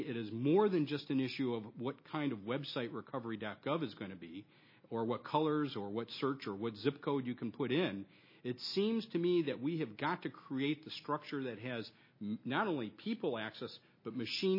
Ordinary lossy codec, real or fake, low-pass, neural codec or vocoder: MP3, 24 kbps; real; 5.4 kHz; none